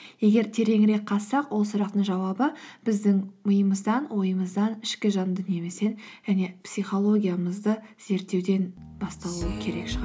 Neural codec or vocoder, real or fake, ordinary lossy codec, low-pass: none; real; none; none